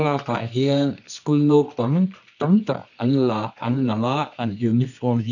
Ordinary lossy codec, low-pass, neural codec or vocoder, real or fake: none; 7.2 kHz; codec, 24 kHz, 0.9 kbps, WavTokenizer, medium music audio release; fake